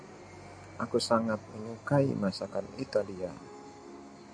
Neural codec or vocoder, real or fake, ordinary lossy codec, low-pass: none; real; AAC, 64 kbps; 9.9 kHz